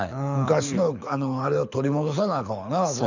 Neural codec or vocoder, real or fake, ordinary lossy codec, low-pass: codec, 24 kHz, 6 kbps, HILCodec; fake; none; 7.2 kHz